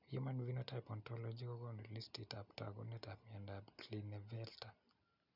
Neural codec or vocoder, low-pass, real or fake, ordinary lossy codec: none; 5.4 kHz; real; none